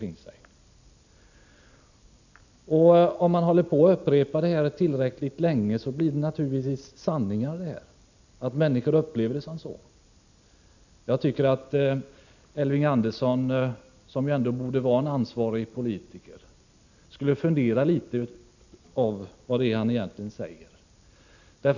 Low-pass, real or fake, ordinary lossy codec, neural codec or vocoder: 7.2 kHz; real; none; none